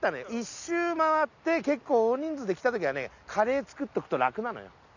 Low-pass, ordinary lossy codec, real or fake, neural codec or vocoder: 7.2 kHz; none; real; none